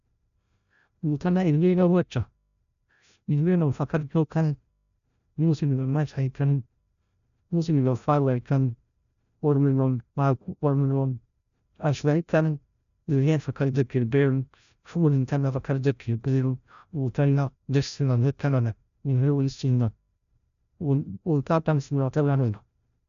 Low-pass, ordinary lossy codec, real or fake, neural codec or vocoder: 7.2 kHz; none; fake; codec, 16 kHz, 0.5 kbps, FreqCodec, larger model